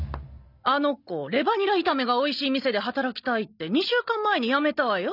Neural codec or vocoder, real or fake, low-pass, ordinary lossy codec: none; real; 5.4 kHz; none